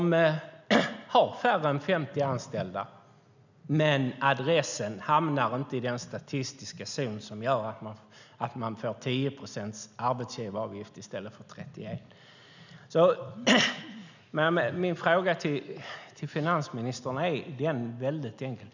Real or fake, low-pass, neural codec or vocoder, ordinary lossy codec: real; 7.2 kHz; none; none